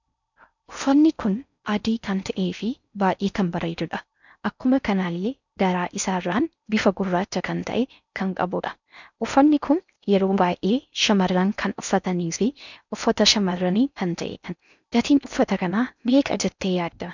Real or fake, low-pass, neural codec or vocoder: fake; 7.2 kHz; codec, 16 kHz in and 24 kHz out, 0.6 kbps, FocalCodec, streaming, 4096 codes